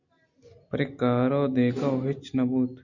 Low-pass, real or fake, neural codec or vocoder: 7.2 kHz; real; none